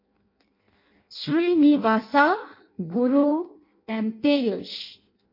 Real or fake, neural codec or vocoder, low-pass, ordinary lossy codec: fake; codec, 16 kHz in and 24 kHz out, 0.6 kbps, FireRedTTS-2 codec; 5.4 kHz; MP3, 32 kbps